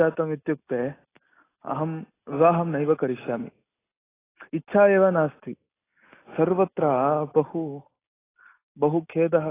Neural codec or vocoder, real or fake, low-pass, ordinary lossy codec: none; real; 3.6 kHz; AAC, 16 kbps